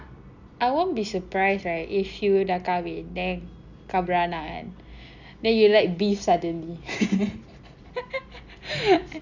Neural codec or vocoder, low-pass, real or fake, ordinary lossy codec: none; 7.2 kHz; real; none